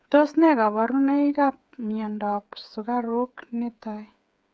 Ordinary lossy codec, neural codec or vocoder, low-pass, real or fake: none; codec, 16 kHz, 8 kbps, FreqCodec, smaller model; none; fake